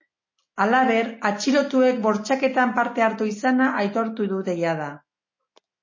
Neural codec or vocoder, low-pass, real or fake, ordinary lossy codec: none; 7.2 kHz; real; MP3, 32 kbps